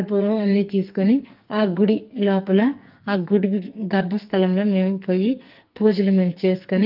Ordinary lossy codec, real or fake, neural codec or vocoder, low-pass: Opus, 24 kbps; fake; codec, 44.1 kHz, 2.6 kbps, SNAC; 5.4 kHz